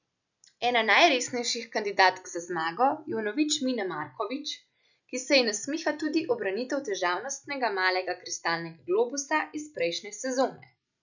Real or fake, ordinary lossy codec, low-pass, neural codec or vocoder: real; none; 7.2 kHz; none